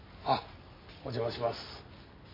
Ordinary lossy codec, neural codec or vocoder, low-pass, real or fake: MP3, 24 kbps; none; 5.4 kHz; real